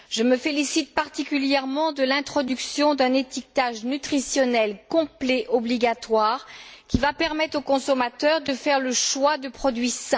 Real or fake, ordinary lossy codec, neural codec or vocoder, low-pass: real; none; none; none